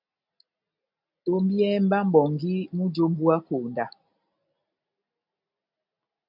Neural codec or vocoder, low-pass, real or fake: none; 5.4 kHz; real